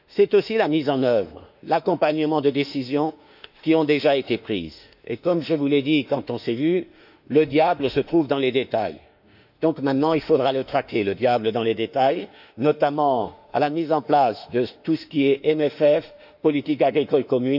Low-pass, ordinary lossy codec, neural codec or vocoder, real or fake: 5.4 kHz; none; autoencoder, 48 kHz, 32 numbers a frame, DAC-VAE, trained on Japanese speech; fake